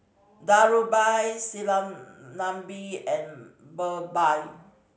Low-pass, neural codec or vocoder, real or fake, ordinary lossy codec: none; none; real; none